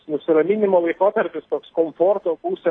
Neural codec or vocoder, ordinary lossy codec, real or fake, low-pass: none; AAC, 32 kbps; real; 9.9 kHz